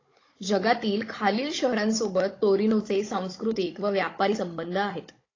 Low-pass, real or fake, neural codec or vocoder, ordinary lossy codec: 7.2 kHz; fake; codec, 16 kHz, 16 kbps, FunCodec, trained on Chinese and English, 50 frames a second; AAC, 32 kbps